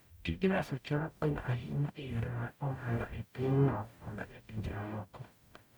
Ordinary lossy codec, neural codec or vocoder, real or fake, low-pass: none; codec, 44.1 kHz, 0.9 kbps, DAC; fake; none